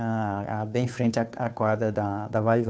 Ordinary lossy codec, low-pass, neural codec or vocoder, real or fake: none; none; codec, 16 kHz, 2 kbps, FunCodec, trained on Chinese and English, 25 frames a second; fake